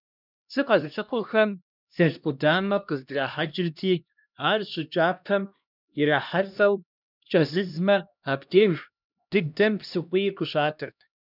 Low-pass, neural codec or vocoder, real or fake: 5.4 kHz; codec, 16 kHz, 1 kbps, X-Codec, HuBERT features, trained on LibriSpeech; fake